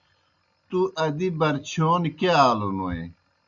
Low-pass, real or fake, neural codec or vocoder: 7.2 kHz; real; none